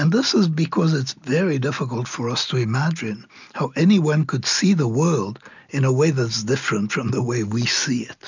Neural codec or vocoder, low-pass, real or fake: none; 7.2 kHz; real